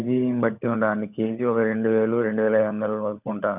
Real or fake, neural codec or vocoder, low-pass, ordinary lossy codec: fake; codec, 16 kHz, 16 kbps, FunCodec, trained on LibriTTS, 50 frames a second; 3.6 kHz; none